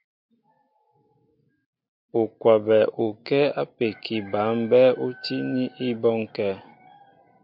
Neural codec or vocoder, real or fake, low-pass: none; real; 5.4 kHz